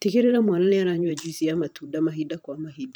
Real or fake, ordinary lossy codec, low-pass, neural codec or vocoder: fake; none; none; vocoder, 44.1 kHz, 128 mel bands every 512 samples, BigVGAN v2